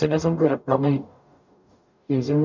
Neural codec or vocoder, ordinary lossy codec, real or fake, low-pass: codec, 44.1 kHz, 0.9 kbps, DAC; none; fake; 7.2 kHz